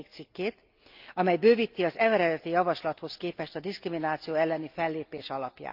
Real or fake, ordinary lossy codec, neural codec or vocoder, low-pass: real; Opus, 24 kbps; none; 5.4 kHz